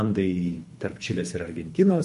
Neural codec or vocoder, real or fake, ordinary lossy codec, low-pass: codec, 24 kHz, 3 kbps, HILCodec; fake; MP3, 48 kbps; 10.8 kHz